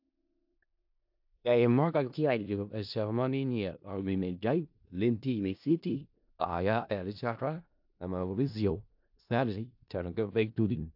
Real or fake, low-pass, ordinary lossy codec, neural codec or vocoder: fake; 5.4 kHz; AAC, 48 kbps; codec, 16 kHz in and 24 kHz out, 0.4 kbps, LongCat-Audio-Codec, four codebook decoder